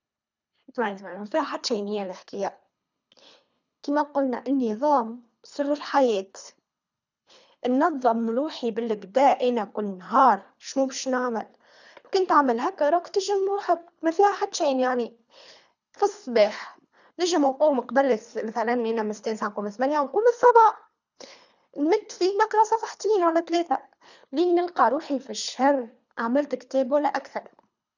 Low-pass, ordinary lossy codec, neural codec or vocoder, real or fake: 7.2 kHz; none; codec, 24 kHz, 3 kbps, HILCodec; fake